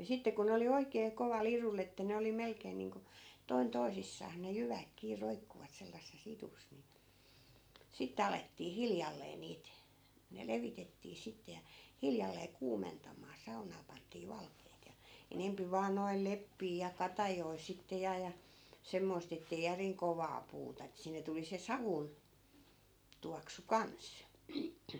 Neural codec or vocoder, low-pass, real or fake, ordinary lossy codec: none; none; real; none